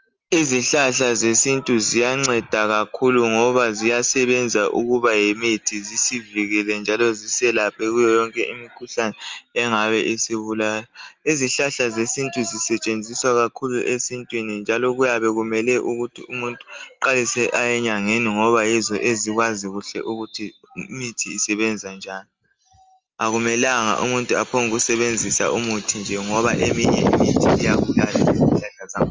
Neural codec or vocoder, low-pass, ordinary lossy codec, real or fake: none; 7.2 kHz; Opus, 32 kbps; real